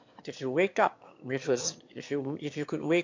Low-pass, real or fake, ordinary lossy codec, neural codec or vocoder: 7.2 kHz; fake; MP3, 48 kbps; autoencoder, 22.05 kHz, a latent of 192 numbers a frame, VITS, trained on one speaker